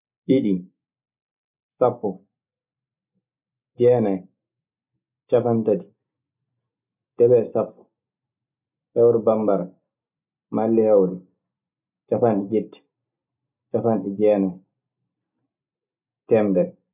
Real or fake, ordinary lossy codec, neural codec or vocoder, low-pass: real; none; none; 3.6 kHz